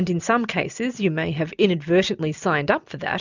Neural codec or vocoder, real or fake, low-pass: none; real; 7.2 kHz